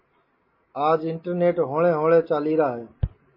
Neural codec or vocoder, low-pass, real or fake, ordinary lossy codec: none; 5.4 kHz; real; MP3, 24 kbps